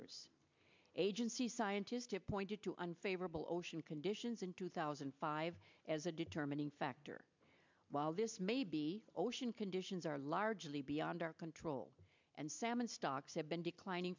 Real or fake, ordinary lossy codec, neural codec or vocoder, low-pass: real; MP3, 64 kbps; none; 7.2 kHz